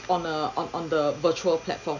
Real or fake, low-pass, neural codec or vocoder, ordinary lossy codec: real; 7.2 kHz; none; none